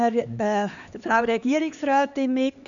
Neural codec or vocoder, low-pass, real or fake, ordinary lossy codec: codec, 16 kHz, 2 kbps, X-Codec, HuBERT features, trained on LibriSpeech; 7.2 kHz; fake; none